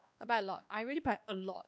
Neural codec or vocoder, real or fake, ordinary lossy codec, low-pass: codec, 16 kHz, 2 kbps, X-Codec, WavLM features, trained on Multilingual LibriSpeech; fake; none; none